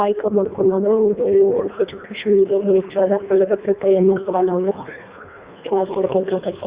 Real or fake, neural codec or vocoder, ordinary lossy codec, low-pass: fake; codec, 24 kHz, 1.5 kbps, HILCodec; Opus, 64 kbps; 3.6 kHz